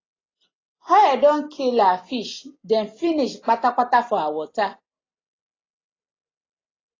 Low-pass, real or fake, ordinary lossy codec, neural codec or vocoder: 7.2 kHz; real; AAC, 32 kbps; none